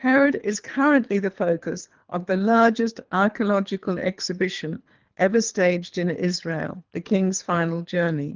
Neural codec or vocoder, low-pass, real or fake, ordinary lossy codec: codec, 24 kHz, 3 kbps, HILCodec; 7.2 kHz; fake; Opus, 32 kbps